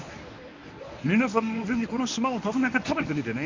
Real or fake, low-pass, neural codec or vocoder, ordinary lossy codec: fake; 7.2 kHz; codec, 24 kHz, 0.9 kbps, WavTokenizer, medium speech release version 1; MP3, 64 kbps